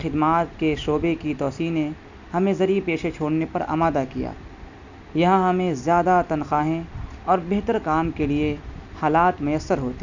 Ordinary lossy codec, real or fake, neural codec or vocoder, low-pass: none; real; none; 7.2 kHz